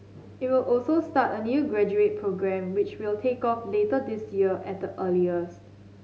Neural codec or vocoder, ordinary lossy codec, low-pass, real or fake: none; none; none; real